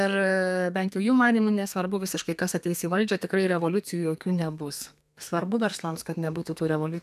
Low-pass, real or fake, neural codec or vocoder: 14.4 kHz; fake; codec, 32 kHz, 1.9 kbps, SNAC